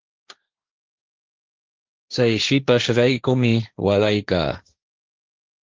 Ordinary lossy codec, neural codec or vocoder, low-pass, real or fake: Opus, 32 kbps; codec, 16 kHz, 1.1 kbps, Voila-Tokenizer; 7.2 kHz; fake